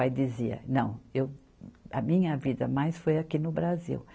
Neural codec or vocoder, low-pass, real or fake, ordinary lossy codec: none; none; real; none